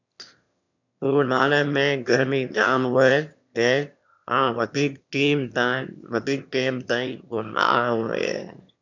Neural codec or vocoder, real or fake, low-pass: autoencoder, 22.05 kHz, a latent of 192 numbers a frame, VITS, trained on one speaker; fake; 7.2 kHz